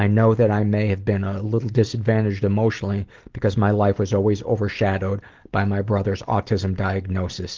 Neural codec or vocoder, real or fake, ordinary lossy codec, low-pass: none; real; Opus, 16 kbps; 7.2 kHz